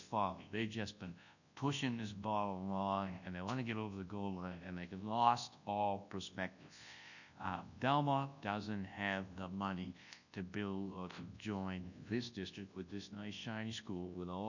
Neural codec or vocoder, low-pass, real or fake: codec, 24 kHz, 0.9 kbps, WavTokenizer, large speech release; 7.2 kHz; fake